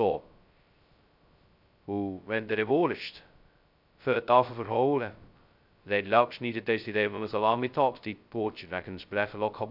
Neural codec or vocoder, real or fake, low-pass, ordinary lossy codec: codec, 16 kHz, 0.2 kbps, FocalCodec; fake; 5.4 kHz; none